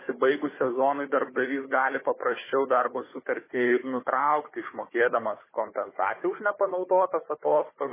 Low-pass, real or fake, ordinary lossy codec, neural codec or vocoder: 3.6 kHz; fake; MP3, 16 kbps; codec, 16 kHz, 4 kbps, FunCodec, trained on Chinese and English, 50 frames a second